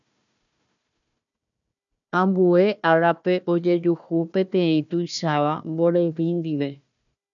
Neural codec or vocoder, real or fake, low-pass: codec, 16 kHz, 1 kbps, FunCodec, trained on Chinese and English, 50 frames a second; fake; 7.2 kHz